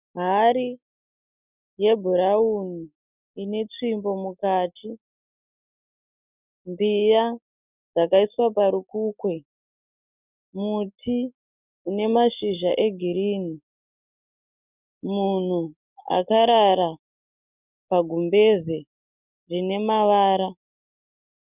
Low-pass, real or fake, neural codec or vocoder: 3.6 kHz; real; none